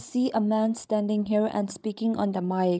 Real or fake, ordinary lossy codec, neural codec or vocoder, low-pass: fake; none; codec, 16 kHz, 16 kbps, FreqCodec, larger model; none